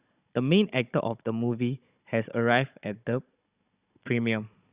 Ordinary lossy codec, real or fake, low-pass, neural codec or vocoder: Opus, 64 kbps; fake; 3.6 kHz; codec, 16 kHz, 16 kbps, FunCodec, trained on Chinese and English, 50 frames a second